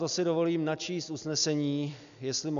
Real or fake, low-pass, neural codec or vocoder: real; 7.2 kHz; none